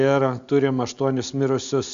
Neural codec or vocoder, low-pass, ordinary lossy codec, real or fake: none; 7.2 kHz; Opus, 64 kbps; real